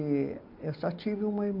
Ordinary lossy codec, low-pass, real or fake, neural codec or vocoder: MP3, 48 kbps; 5.4 kHz; real; none